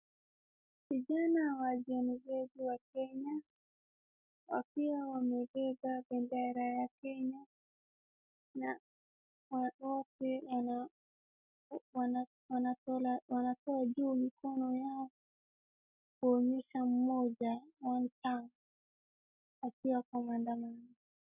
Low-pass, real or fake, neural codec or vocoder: 3.6 kHz; real; none